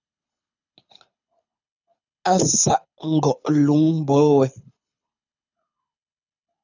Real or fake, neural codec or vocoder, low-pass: fake; codec, 24 kHz, 6 kbps, HILCodec; 7.2 kHz